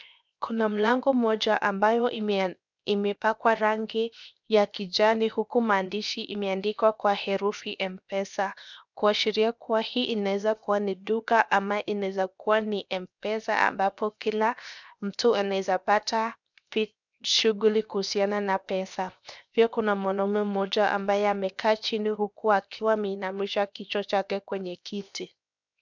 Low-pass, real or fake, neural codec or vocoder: 7.2 kHz; fake; codec, 16 kHz, 0.7 kbps, FocalCodec